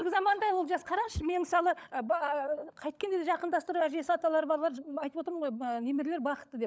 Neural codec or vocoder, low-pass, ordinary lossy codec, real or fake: codec, 16 kHz, 16 kbps, FunCodec, trained on LibriTTS, 50 frames a second; none; none; fake